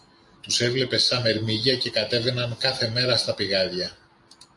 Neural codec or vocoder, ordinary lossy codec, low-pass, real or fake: none; AAC, 64 kbps; 10.8 kHz; real